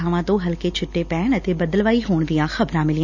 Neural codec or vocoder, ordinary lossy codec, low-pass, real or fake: none; none; 7.2 kHz; real